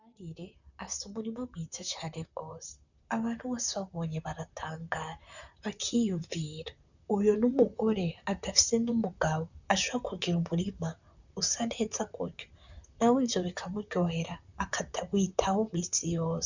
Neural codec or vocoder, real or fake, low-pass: vocoder, 44.1 kHz, 128 mel bands, Pupu-Vocoder; fake; 7.2 kHz